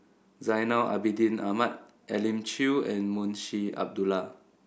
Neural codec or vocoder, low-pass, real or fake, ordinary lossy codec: none; none; real; none